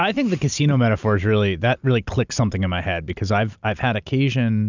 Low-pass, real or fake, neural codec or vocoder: 7.2 kHz; real; none